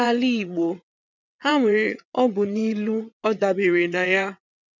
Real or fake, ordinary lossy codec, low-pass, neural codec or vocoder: fake; none; 7.2 kHz; vocoder, 22.05 kHz, 80 mel bands, WaveNeXt